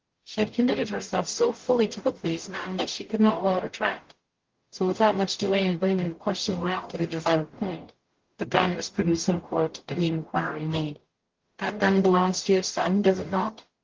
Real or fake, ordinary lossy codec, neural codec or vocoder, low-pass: fake; Opus, 16 kbps; codec, 44.1 kHz, 0.9 kbps, DAC; 7.2 kHz